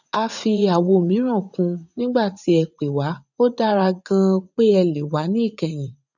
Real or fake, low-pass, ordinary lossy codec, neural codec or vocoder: fake; 7.2 kHz; none; vocoder, 44.1 kHz, 80 mel bands, Vocos